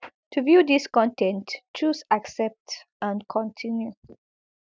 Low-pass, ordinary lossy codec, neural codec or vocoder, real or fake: none; none; none; real